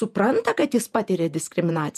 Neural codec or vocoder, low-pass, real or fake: vocoder, 48 kHz, 128 mel bands, Vocos; 14.4 kHz; fake